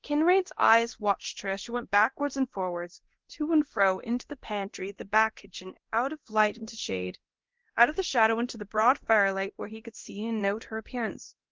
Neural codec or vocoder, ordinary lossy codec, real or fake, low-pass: codec, 24 kHz, 0.9 kbps, DualCodec; Opus, 16 kbps; fake; 7.2 kHz